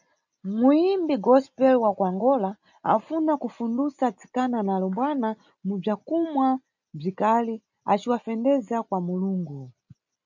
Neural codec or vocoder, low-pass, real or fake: none; 7.2 kHz; real